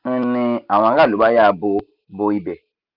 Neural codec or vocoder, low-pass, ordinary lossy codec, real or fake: none; 5.4 kHz; Opus, 24 kbps; real